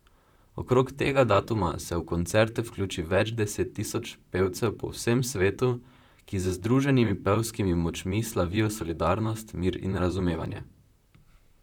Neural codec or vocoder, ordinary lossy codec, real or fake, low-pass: vocoder, 44.1 kHz, 128 mel bands, Pupu-Vocoder; none; fake; 19.8 kHz